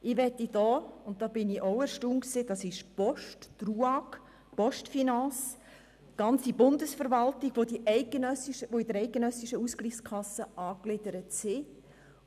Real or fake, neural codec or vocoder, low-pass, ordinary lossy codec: real; none; 14.4 kHz; none